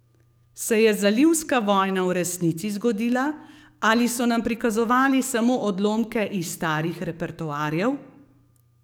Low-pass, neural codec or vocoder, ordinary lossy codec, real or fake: none; codec, 44.1 kHz, 7.8 kbps, DAC; none; fake